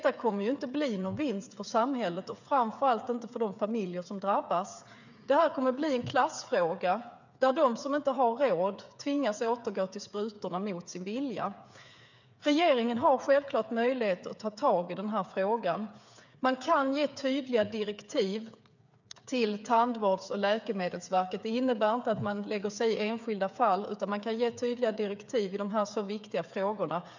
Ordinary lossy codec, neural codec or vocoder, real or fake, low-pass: none; codec, 16 kHz, 8 kbps, FreqCodec, smaller model; fake; 7.2 kHz